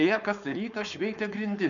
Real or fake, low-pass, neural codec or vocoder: fake; 7.2 kHz; codec, 16 kHz, 4.8 kbps, FACodec